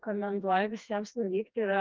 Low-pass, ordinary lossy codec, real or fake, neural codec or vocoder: 7.2 kHz; Opus, 32 kbps; fake; codec, 16 kHz, 2 kbps, FreqCodec, smaller model